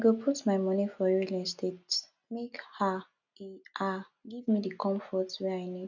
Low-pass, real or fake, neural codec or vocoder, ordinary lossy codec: 7.2 kHz; real; none; none